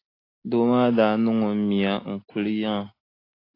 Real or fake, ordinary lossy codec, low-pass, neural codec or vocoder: real; AAC, 32 kbps; 5.4 kHz; none